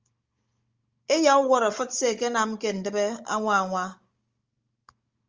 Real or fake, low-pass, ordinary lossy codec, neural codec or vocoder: fake; 7.2 kHz; Opus, 32 kbps; codec, 16 kHz, 16 kbps, FunCodec, trained on Chinese and English, 50 frames a second